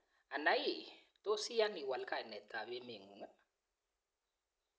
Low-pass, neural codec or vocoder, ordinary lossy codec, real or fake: none; none; none; real